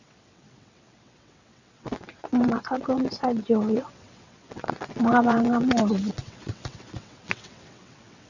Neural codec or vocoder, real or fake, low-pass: vocoder, 22.05 kHz, 80 mel bands, WaveNeXt; fake; 7.2 kHz